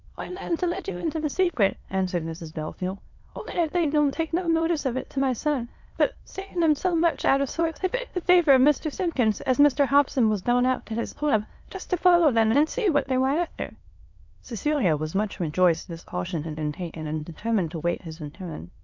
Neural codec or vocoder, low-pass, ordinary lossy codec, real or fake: autoencoder, 22.05 kHz, a latent of 192 numbers a frame, VITS, trained on many speakers; 7.2 kHz; MP3, 64 kbps; fake